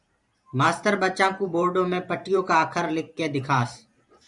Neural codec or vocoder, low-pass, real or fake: vocoder, 44.1 kHz, 128 mel bands every 512 samples, BigVGAN v2; 10.8 kHz; fake